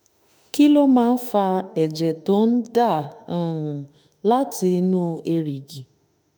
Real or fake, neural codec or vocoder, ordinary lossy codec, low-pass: fake; autoencoder, 48 kHz, 32 numbers a frame, DAC-VAE, trained on Japanese speech; none; none